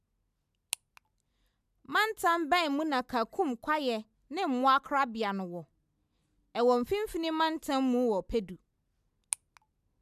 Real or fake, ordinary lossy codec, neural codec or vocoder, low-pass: real; none; none; 14.4 kHz